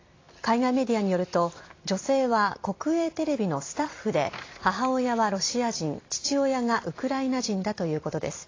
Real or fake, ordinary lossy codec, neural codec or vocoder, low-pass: fake; AAC, 32 kbps; vocoder, 44.1 kHz, 128 mel bands every 256 samples, BigVGAN v2; 7.2 kHz